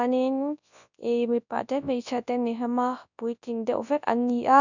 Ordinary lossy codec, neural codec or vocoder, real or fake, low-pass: none; codec, 24 kHz, 0.9 kbps, WavTokenizer, large speech release; fake; 7.2 kHz